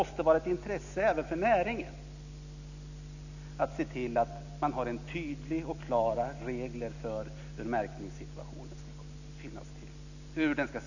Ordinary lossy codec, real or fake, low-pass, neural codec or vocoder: none; real; 7.2 kHz; none